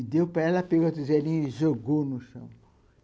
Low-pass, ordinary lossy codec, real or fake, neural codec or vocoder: none; none; real; none